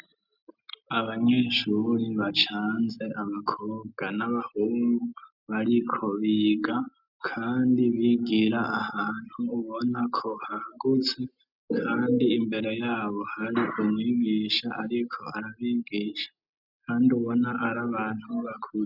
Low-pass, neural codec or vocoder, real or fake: 5.4 kHz; none; real